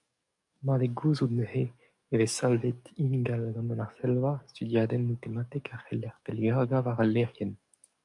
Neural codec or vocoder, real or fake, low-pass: codec, 44.1 kHz, 7.8 kbps, DAC; fake; 10.8 kHz